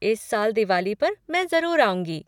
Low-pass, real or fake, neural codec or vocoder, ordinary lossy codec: 19.8 kHz; real; none; none